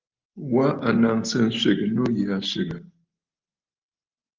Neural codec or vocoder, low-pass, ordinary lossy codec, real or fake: none; 7.2 kHz; Opus, 32 kbps; real